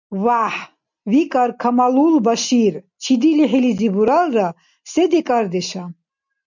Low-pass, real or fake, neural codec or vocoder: 7.2 kHz; real; none